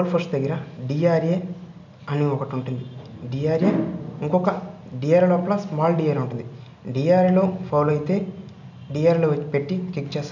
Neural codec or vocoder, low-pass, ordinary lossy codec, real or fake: none; 7.2 kHz; none; real